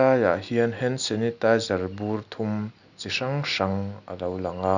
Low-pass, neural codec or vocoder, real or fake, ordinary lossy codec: 7.2 kHz; none; real; none